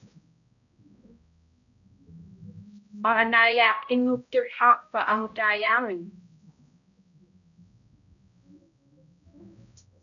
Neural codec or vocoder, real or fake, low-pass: codec, 16 kHz, 0.5 kbps, X-Codec, HuBERT features, trained on balanced general audio; fake; 7.2 kHz